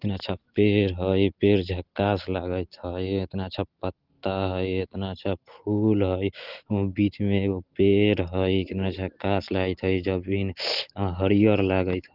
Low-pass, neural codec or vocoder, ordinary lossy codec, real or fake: 5.4 kHz; none; Opus, 16 kbps; real